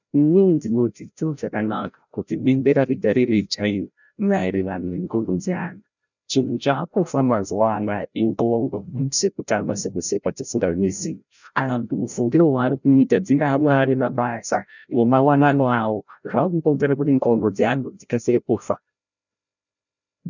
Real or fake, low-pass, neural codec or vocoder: fake; 7.2 kHz; codec, 16 kHz, 0.5 kbps, FreqCodec, larger model